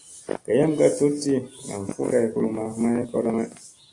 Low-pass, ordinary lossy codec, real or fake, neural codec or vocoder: 10.8 kHz; AAC, 48 kbps; real; none